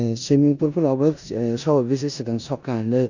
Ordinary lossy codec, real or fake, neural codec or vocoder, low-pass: none; fake; codec, 16 kHz in and 24 kHz out, 0.9 kbps, LongCat-Audio-Codec, four codebook decoder; 7.2 kHz